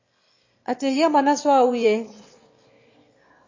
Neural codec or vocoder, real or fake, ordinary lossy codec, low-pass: autoencoder, 22.05 kHz, a latent of 192 numbers a frame, VITS, trained on one speaker; fake; MP3, 32 kbps; 7.2 kHz